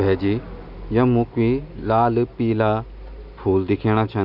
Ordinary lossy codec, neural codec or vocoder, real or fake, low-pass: none; none; real; 5.4 kHz